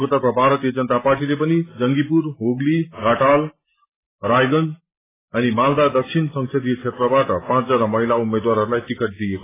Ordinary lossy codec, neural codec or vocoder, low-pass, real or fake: AAC, 16 kbps; none; 3.6 kHz; real